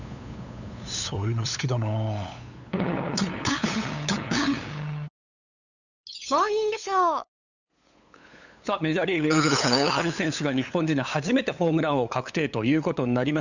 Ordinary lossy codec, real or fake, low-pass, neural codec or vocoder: none; fake; 7.2 kHz; codec, 16 kHz, 8 kbps, FunCodec, trained on LibriTTS, 25 frames a second